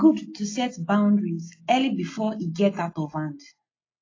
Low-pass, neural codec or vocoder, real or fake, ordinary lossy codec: 7.2 kHz; none; real; AAC, 32 kbps